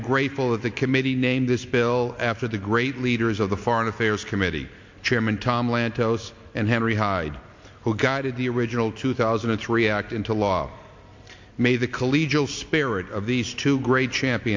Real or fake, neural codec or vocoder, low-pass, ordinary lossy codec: real; none; 7.2 kHz; MP3, 48 kbps